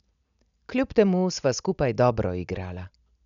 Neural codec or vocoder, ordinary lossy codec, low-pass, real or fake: none; none; 7.2 kHz; real